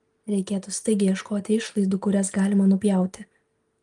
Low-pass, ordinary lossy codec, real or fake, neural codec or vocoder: 9.9 kHz; Opus, 24 kbps; real; none